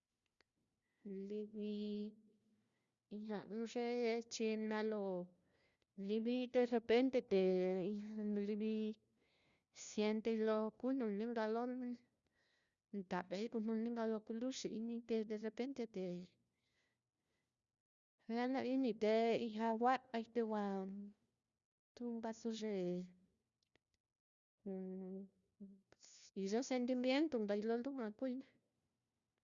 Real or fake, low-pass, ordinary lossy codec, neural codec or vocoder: fake; 7.2 kHz; Opus, 64 kbps; codec, 16 kHz, 1 kbps, FunCodec, trained on LibriTTS, 50 frames a second